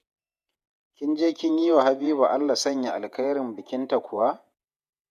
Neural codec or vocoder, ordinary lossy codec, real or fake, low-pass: vocoder, 48 kHz, 128 mel bands, Vocos; none; fake; 14.4 kHz